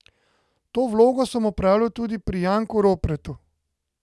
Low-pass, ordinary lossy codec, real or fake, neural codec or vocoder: none; none; real; none